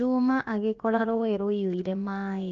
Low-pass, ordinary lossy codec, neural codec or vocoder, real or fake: 7.2 kHz; Opus, 32 kbps; codec, 16 kHz, about 1 kbps, DyCAST, with the encoder's durations; fake